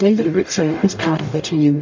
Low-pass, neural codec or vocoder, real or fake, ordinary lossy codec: 7.2 kHz; codec, 44.1 kHz, 0.9 kbps, DAC; fake; MP3, 48 kbps